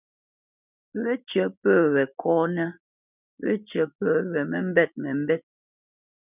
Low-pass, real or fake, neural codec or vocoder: 3.6 kHz; real; none